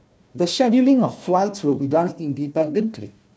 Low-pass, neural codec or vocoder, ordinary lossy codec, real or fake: none; codec, 16 kHz, 1 kbps, FunCodec, trained on Chinese and English, 50 frames a second; none; fake